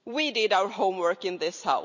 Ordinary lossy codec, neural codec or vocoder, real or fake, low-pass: none; none; real; 7.2 kHz